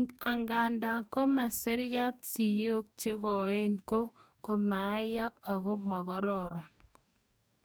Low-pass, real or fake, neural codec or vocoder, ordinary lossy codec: none; fake; codec, 44.1 kHz, 2.6 kbps, DAC; none